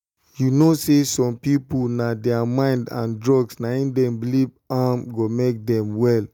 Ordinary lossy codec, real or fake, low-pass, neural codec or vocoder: none; real; 19.8 kHz; none